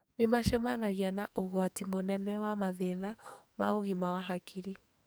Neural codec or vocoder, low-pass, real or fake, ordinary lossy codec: codec, 44.1 kHz, 2.6 kbps, SNAC; none; fake; none